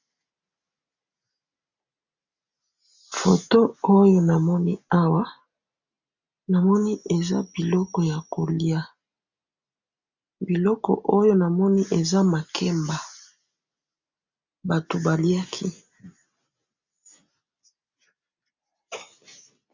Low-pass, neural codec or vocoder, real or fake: 7.2 kHz; none; real